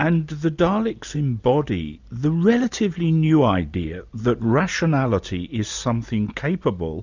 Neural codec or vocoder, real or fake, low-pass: none; real; 7.2 kHz